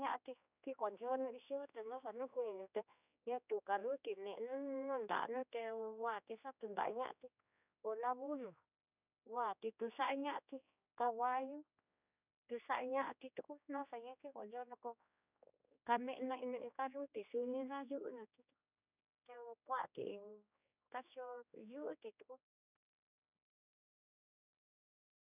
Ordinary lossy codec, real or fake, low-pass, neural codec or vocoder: none; fake; 3.6 kHz; codec, 16 kHz, 2 kbps, X-Codec, HuBERT features, trained on general audio